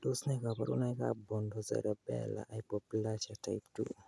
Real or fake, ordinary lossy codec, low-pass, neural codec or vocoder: real; none; none; none